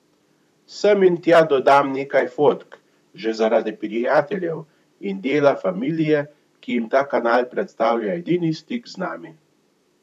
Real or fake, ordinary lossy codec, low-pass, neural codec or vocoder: fake; none; 14.4 kHz; vocoder, 44.1 kHz, 128 mel bands, Pupu-Vocoder